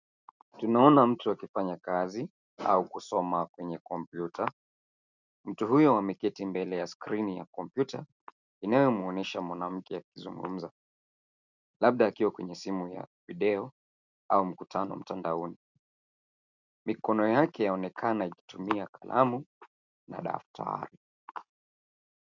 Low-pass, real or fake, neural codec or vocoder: 7.2 kHz; real; none